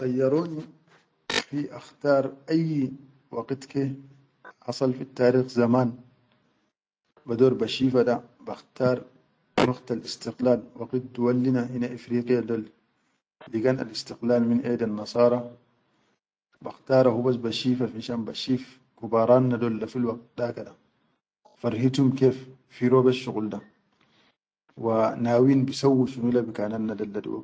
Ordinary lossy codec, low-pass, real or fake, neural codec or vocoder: none; none; real; none